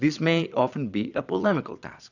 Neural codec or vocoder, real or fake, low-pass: vocoder, 22.05 kHz, 80 mel bands, Vocos; fake; 7.2 kHz